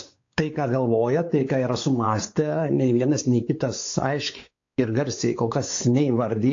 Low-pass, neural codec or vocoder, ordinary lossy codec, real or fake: 7.2 kHz; codec, 16 kHz, 4 kbps, X-Codec, WavLM features, trained on Multilingual LibriSpeech; AAC, 48 kbps; fake